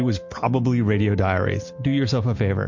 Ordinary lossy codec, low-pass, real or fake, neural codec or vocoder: MP3, 48 kbps; 7.2 kHz; real; none